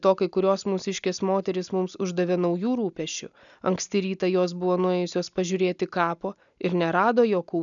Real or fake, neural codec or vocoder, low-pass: real; none; 7.2 kHz